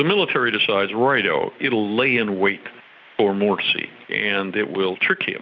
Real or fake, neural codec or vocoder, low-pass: real; none; 7.2 kHz